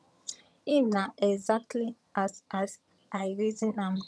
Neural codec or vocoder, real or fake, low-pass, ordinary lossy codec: vocoder, 22.05 kHz, 80 mel bands, HiFi-GAN; fake; none; none